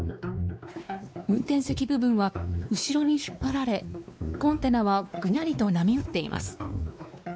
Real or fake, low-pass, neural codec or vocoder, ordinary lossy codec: fake; none; codec, 16 kHz, 2 kbps, X-Codec, WavLM features, trained on Multilingual LibriSpeech; none